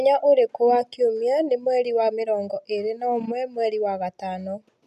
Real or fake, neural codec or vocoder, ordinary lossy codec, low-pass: real; none; none; 14.4 kHz